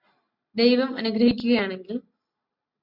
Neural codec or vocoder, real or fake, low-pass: none; real; 5.4 kHz